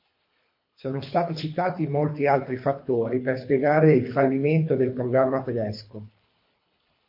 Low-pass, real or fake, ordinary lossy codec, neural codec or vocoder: 5.4 kHz; fake; MP3, 32 kbps; codec, 24 kHz, 3 kbps, HILCodec